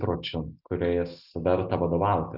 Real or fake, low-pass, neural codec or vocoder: real; 5.4 kHz; none